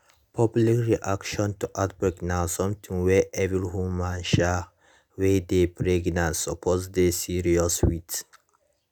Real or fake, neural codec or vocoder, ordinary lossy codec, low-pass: real; none; none; none